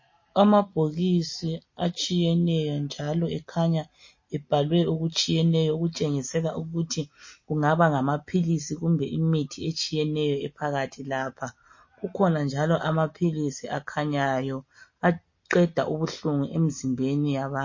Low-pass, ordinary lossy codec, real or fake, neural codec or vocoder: 7.2 kHz; MP3, 32 kbps; real; none